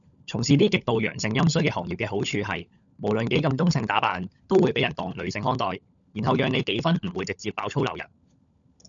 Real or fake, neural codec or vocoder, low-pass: fake; codec, 16 kHz, 16 kbps, FunCodec, trained on LibriTTS, 50 frames a second; 7.2 kHz